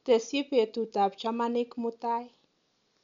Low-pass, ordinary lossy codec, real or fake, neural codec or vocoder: 7.2 kHz; none; real; none